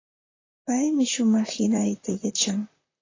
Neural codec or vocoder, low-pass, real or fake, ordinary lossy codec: vocoder, 44.1 kHz, 128 mel bands, Pupu-Vocoder; 7.2 kHz; fake; AAC, 32 kbps